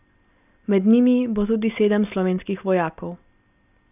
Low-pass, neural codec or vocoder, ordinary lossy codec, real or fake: 3.6 kHz; none; none; real